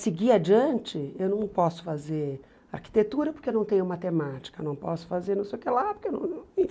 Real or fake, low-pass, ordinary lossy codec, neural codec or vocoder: real; none; none; none